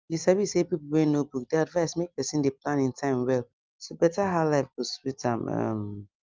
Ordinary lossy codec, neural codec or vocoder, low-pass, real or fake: Opus, 32 kbps; none; 7.2 kHz; real